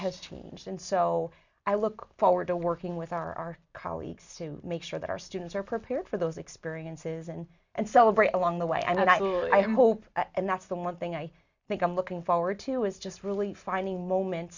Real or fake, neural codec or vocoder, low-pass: real; none; 7.2 kHz